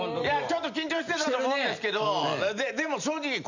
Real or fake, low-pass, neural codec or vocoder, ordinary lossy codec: real; 7.2 kHz; none; none